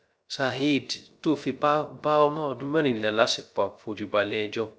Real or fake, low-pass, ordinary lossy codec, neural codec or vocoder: fake; none; none; codec, 16 kHz, 0.3 kbps, FocalCodec